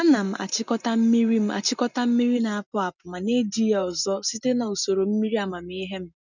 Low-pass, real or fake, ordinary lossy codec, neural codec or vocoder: 7.2 kHz; real; none; none